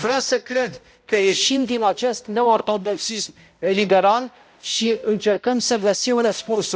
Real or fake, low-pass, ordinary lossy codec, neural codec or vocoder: fake; none; none; codec, 16 kHz, 0.5 kbps, X-Codec, HuBERT features, trained on balanced general audio